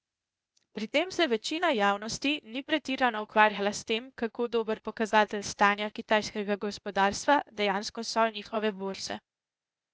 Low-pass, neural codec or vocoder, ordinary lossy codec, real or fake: none; codec, 16 kHz, 0.8 kbps, ZipCodec; none; fake